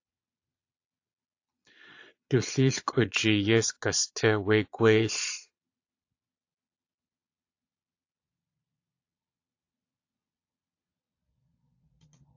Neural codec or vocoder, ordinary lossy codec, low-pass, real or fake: vocoder, 22.05 kHz, 80 mel bands, Vocos; AAC, 48 kbps; 7.2 kHz; fake